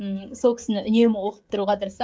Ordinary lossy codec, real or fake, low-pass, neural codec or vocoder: none; fake; none; codec, 16 kHz, 16 kbps, FreqCodec, smaller model